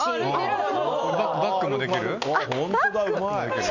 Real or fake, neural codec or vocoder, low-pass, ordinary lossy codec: real; none; 7.2 kHz; none